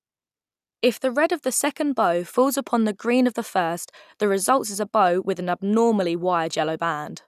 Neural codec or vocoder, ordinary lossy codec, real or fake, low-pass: none; none; real; 14.4 kHz